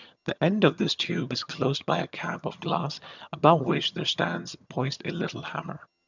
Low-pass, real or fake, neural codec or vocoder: 7.2 kHz; fake; vocoder, 22.05 kHz, 80 mel bands, HiFi-GAN